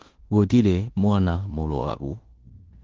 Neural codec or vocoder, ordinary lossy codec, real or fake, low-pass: codec, 16 kHz in and 24 kHz out, 0.9 kbps, LongCat-Audio-Codec, fine tuned four codebook decoder; Opus, 16 kbps; fake; 7.2 kHz